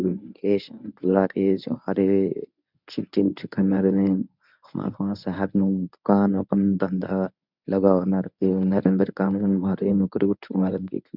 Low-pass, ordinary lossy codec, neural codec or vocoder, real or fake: 5.4 kHz; none; codec, 24 kHz, 0.9 kbps, WavTokenizer, medium speech release version 1; fake